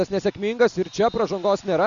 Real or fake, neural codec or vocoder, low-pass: real; none; 7.2 kHz